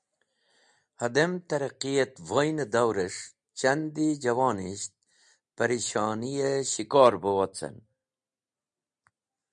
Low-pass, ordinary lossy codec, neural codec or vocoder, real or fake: 9.9 kHz; MP3, 96 kbps; none; real